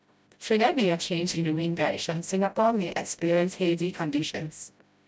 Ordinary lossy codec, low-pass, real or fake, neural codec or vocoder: none; none; fake; codec, 16 kHz, 0.5 kbps, FreqCodec, smaller model